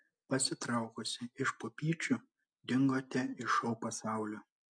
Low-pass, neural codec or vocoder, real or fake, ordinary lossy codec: 9.9 kHz; none; real; MP3, 64 kbps